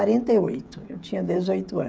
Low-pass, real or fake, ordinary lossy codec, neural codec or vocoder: none; fake; none; codec, 16 kHz, 16 kbps, FreqCodec, smaller model